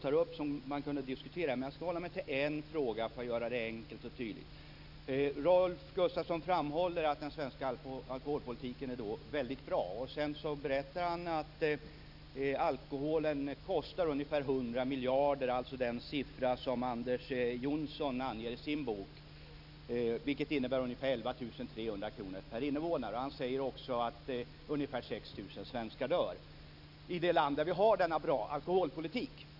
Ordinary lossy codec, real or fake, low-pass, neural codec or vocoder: none; real; 5.4 kHz; none